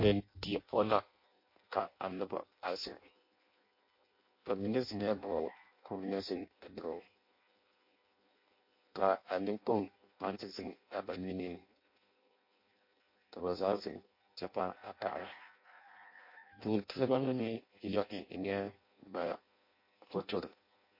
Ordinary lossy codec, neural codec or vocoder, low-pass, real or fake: MP3, 32 kbps; codec, 16 kHz in and 24 kHz out, 0.6 kbps, FireRedTTS-2 codec; 5.4 kHz; fake